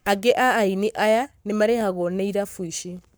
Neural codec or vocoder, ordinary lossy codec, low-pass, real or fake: codec, 44.1 kHz, 7.8 kbps, Pupu-Codec; none; none; fake